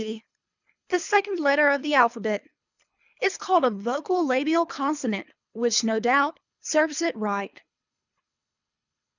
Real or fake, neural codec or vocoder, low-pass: fake; codec, 24 kHz, 3 kbps, HILCodec; 7.2 kHz